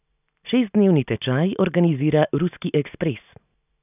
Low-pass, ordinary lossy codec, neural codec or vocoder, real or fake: 3.6 kHz; none; none; real